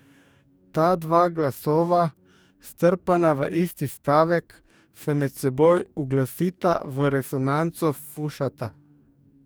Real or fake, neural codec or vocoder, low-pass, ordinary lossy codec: fake; codec, 44.1 kHz, 2.6 kbps, DAC; none; none